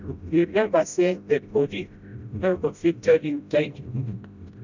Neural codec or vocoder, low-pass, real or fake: codec, 16 kHz, 0.5 kbps, FreqCodec, smaller model; 7.2 kHz; fake